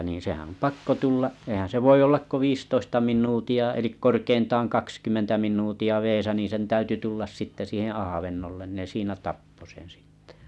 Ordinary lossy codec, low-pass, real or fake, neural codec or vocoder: none; none; real; none